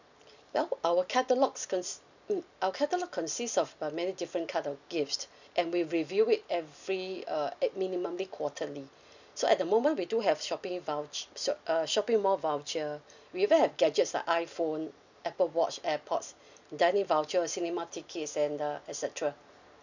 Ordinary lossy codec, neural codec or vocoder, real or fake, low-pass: none; none; real; 7.2 kHz